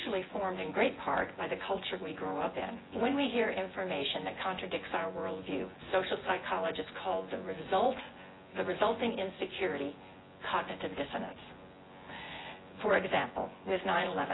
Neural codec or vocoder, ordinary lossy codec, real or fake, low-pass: vocoder, 24 kHz, 100 mel bands, Vocos; AAC, 16 kbps; fake; 7.2 kHz